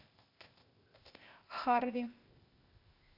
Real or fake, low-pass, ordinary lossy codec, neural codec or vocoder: fake; 5.4 kHz; AAC, 32 kbps; codec, 16 kHz, 0.8 kbps, ZipCodec